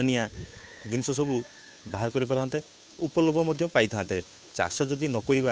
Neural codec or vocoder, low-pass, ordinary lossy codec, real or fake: codec, 16 kHz, 2 kbps, FunCodec, trained on Chinese and English, 25 frames a second; none; none; fake